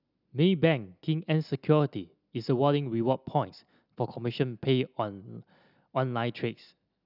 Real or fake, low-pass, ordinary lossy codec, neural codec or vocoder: real; 5.4 kHz; none; none